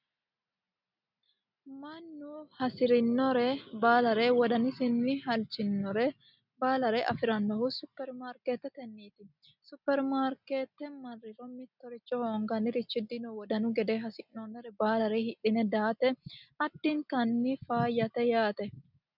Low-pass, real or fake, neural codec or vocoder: 5.4 kHz; real; none